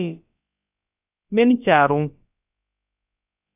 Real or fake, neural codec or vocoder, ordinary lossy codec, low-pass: fake; codec, 16 kHz, about 1 kbps, DyCAST, with the encoder's durations; AAC, 32 kbps; 3.6 kHz